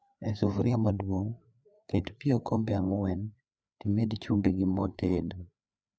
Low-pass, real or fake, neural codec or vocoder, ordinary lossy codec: none; fake; codec, 16 kHz, 4 kbps, FreqCodec, larger model; none